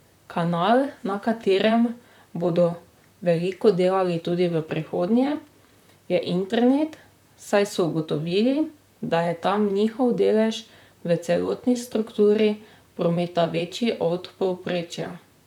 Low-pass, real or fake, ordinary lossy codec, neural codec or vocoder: 19.8 kHz; fake; none; vocoder, 44.1 kHz, 128 mel bands, Pupu-Vocoder